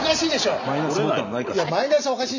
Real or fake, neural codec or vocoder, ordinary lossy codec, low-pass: real; none; none; 7.2 kHz